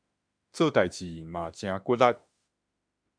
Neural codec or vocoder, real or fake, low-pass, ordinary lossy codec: autoencoder, 48 kHz, 32 numbers a frame, DAC-VAE, trained on Japanese speech; fake; 9.9 kHz; MP3, 96 kbps